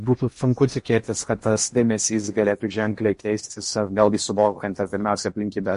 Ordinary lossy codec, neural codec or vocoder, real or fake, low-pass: MP3, 48 kbps; codec, 16 kHz in and 24 kHz out, 0.8 kbps, FocalCodec, streaming, 65536 codes; fake; 10.8 kHz